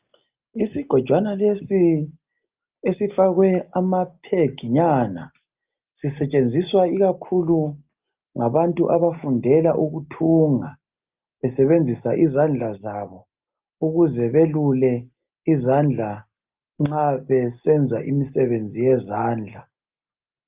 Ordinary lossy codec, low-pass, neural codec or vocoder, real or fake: Opus, 24 kbps; 3.6 kHz; none; real